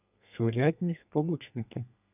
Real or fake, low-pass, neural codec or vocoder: fake; 3.6 kHz; codec, 32 kHz, 1.9 kbps, SNAC